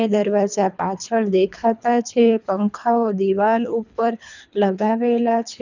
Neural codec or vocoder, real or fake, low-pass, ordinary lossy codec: codec, 24 kHz, 3 kbps, HILCodec; fake; 7.2 kHz; none